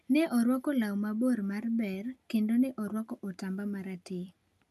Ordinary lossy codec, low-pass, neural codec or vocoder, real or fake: none; 14.4 kHz; none; real